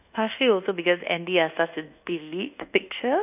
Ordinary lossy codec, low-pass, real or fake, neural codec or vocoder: none; 3.6 kHz; fake; codec, 24 kHz, 1.2 kbps, DualCodec